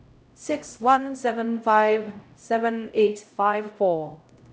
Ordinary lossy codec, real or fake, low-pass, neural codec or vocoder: none; fake; none; codec, 16 kHz, 0.5 kbps, X-Codec, HuBERT features, trained on LibriSpeech